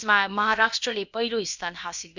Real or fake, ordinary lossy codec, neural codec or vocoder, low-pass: fake; none; codec, 16 kHz, about 1 kbps, DyCAST, with the encoder's durations; 7.2 kHz